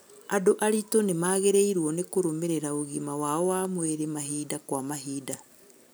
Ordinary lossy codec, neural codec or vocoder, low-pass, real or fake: none; none; none; real